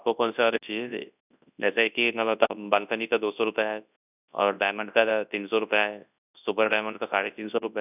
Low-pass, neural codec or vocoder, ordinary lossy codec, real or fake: 3.6 kHz; codec, 24 kHz, 0.9 kbps, WavTokenizer, large speech release; none; fake